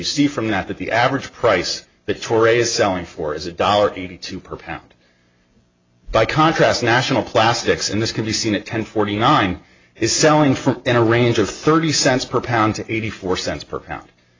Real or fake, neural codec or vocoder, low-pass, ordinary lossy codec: real; none; 7.2 kHz; AAC, 32 kbps